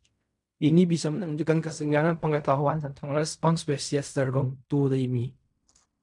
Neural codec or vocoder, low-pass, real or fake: codec, 16 kHz in and 24 kHz out, 0.4 kbps, LongCat-Audio-Codec, fine tuned four codebook decoder; 10.8 kHz; fake